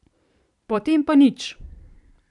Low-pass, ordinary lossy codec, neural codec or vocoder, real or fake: 10.8 kHz; none; vocoder, 44.1 kHz, 128 mel bands every 512 samples, BigVGAN v2; fake